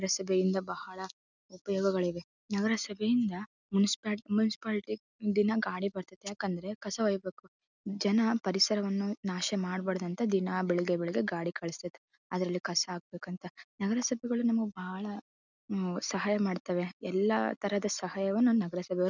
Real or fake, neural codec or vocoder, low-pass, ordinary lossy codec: real; none; 7.2 kHz; none